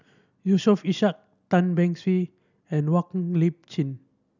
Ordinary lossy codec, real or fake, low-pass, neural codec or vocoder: none; real; 7.2 kHz; none